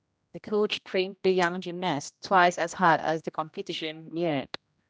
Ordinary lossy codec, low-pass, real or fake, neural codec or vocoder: none; none; fake; codec, 16 kHz, 1 kbps, X-Codec, HuBERT features, trained on general audio